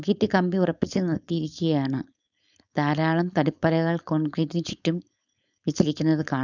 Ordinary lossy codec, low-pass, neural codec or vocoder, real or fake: none; 7.2 kHz; codec, 16 kHz, 4.8 kbps, FACodec; fake